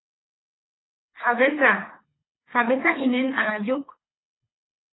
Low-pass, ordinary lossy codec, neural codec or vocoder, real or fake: 7.2 kHz; AAC, 16 kbps; codec, 24 kHz, 3 kbps, HILCodec; fake